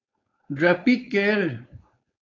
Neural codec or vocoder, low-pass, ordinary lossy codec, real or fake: codec, 16 kHz, 4.8 kbps, FACodec; 7.2 kHz; AAC, 32 kbps; fake